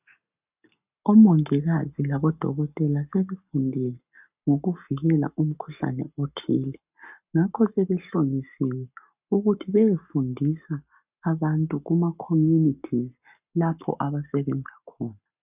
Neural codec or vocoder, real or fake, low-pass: codec, 44.1 kHz, 7.8 kbps, Pupu-Codec; fake; 3.6 kHz